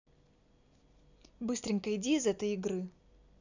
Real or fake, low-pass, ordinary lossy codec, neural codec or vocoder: real; 7.2 kHz; none; none